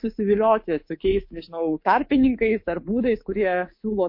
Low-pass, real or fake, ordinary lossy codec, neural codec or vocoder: 5.4 kHz; fake; MP3, 32 kbps; codec, 24 kHz, 3.1 kbps, DualCodec